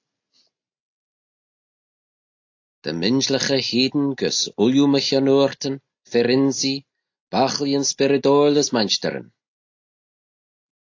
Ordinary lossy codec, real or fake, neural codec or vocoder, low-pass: AAC, 48 kbps; real; none; 7.2 kHz